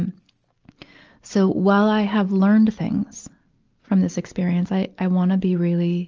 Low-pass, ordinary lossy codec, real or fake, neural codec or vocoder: 7.2 kHz; Opus, 24 kbps; real; none